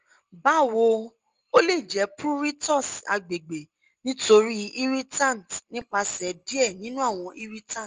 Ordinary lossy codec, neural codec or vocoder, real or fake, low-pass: Opus, 24 kbps; none; real; 7.2 kHz